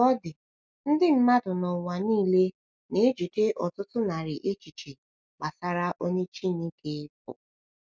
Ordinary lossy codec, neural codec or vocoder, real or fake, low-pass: none; none; real; none